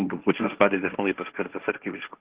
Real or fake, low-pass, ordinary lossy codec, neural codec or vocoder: fake; 3.6 kHz; Opus, 16 kbps; codec, 16 kHz, 1.1 kbps, Voila-Tokenizer